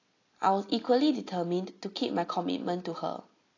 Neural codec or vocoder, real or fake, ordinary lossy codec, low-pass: none; real; AAC, 32 kbps; 7.2 kHz